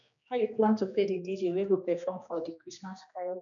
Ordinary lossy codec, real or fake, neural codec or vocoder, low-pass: none; fake; codec, 16 kHz, 2 kbps, X-Codec, HuBERT features, trained on general audio; 7.2 kHz